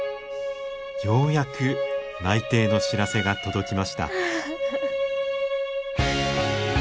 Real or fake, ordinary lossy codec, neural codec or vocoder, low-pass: real; none; none; none